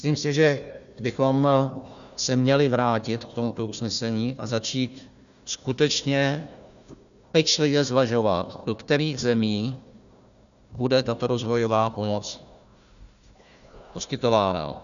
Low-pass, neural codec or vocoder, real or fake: 7.2 kHz; codec, 16 kHz, 1 kbps, FunCodec, trained on Chinese and English, 50 frames a second; fake